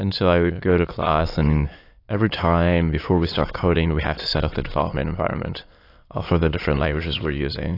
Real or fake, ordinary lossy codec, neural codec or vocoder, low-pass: fake; AAC, 32 kbps; autoencoder, 22.05 kHz, a latent of 192 numbers a frame, VITS, trained on many speakers; 5.4 kHz